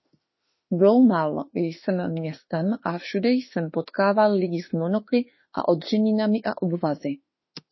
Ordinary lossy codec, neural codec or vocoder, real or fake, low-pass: MP3, 24 kbps; autoencoder, 48 kHz, 32 numbers a frame, DAC-VAE, trained on Japanese speech; fake; 7.2 kHz